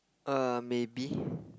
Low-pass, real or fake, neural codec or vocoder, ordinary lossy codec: none; real; none; none